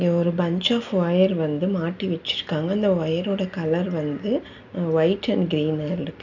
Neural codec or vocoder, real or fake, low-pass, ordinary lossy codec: none; real; 7.2 kHz; none